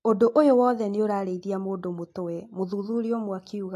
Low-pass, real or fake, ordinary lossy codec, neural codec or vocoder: 14.4 kHz; real; AAC, 64 kbps; none